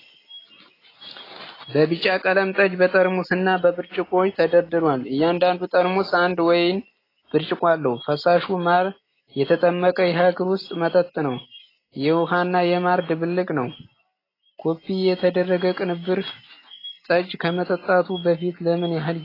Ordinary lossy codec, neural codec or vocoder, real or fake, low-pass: AAC, 24 kbps; none; real; 5.4 kHz